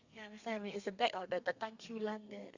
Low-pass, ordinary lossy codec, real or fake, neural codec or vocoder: 7.2 kHz; Opus, 64 kbps; fake; codec, 32 kHz, 1.9 kbps, SNAC